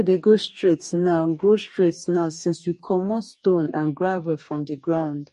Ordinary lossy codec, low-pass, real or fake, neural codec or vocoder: MP3, 48 kbps; 14.4 kHz; fake; codec, 44.1 kHz, 2.6 kbps, DAC